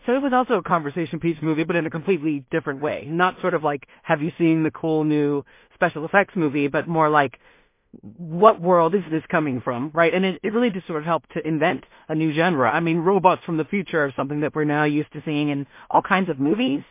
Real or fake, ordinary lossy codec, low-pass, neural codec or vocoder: fake; MP3, 24 kbps; 3.6 kHz; codec, 16 kHz in and 24 kHz out, 0.4 kbps, LongCat-Audio-Codec, two codebook decoder